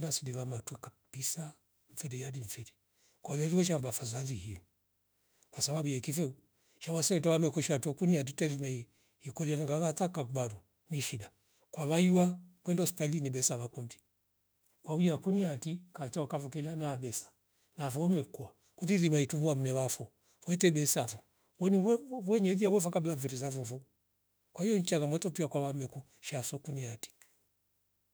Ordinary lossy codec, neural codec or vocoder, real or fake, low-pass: none; autoencoder, 48 kHz, 32 numbers a frame, DAC-VAE, trained on Japanese speech; fake; none